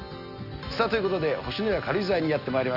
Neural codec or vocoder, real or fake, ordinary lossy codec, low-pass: none; real; none; 5.4 kHz